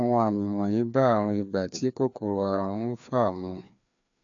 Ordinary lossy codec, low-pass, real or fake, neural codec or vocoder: MP3, 64 kbps; 7.2 kHz; fake; codec, 16 kHz, 2 kbps, FreqCodec, larger model